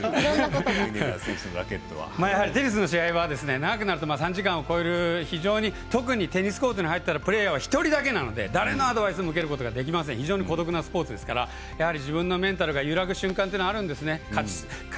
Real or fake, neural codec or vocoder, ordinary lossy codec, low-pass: real; none; none; none